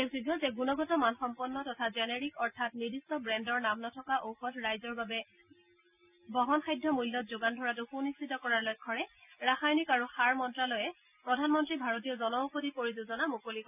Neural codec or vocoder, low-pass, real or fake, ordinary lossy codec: none; 3.6 kHz; real; none